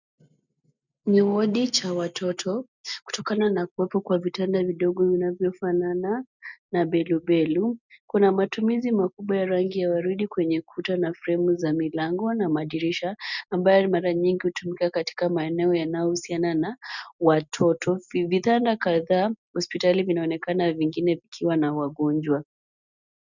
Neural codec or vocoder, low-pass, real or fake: none; 7.2 kHz; real